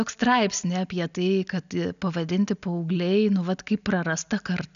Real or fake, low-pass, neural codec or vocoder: real; 7.2 kHz; none